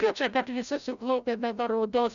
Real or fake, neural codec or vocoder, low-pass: fake; codec, 16 kHz, 0.5 kbps, FreqCodec, larger model; 7.2 kHz